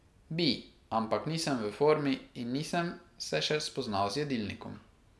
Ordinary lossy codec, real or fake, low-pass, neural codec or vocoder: none; real; none; none